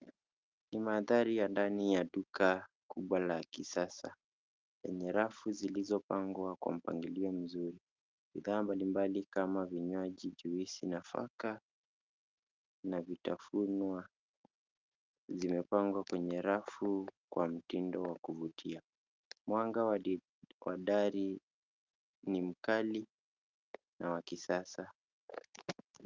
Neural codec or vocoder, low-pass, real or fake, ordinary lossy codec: none; 7.2 kHz; real; Opus, 24 kbps